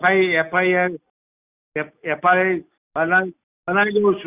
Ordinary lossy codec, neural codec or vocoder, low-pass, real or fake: Opus, 64 kbps; none; 3.6 kHz; real